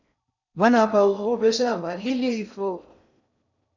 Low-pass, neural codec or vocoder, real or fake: 7.2 kHz; codec, 16 kHz in and 24 kHz out, 0.6 kbps, FocalCodec, streaming, 4096 codes; fake